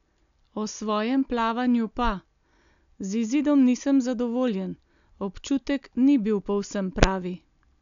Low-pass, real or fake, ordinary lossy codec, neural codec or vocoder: 7.2 kHz; real; none; none